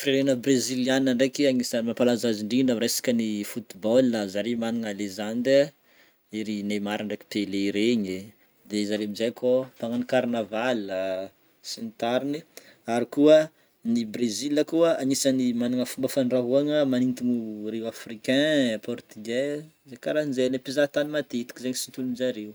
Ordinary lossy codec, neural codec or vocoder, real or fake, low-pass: none; none; real; none